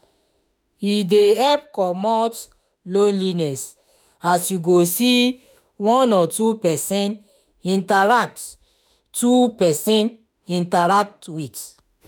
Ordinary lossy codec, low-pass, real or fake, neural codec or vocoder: none; none; fake; autoencoder, 48 kHz, 32 numbers a frame, DAC-VAE, trained on Japanese speech